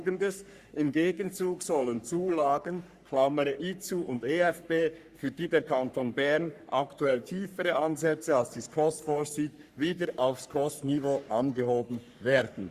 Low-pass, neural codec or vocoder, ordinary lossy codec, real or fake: 14.4 kHz; codec, 44.1 kHz, 3.4 kbps, Pupu-Codec; Opus, 64 kbps; fake